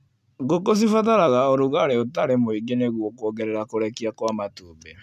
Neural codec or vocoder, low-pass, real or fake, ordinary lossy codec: none; 14.4 kHz; real; none